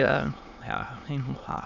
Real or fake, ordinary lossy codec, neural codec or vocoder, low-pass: fake; none; autoencoder, 22.05 kHz, a latent of 192 numbers a frame, VITS, trained on many speakers; 7.2 kHz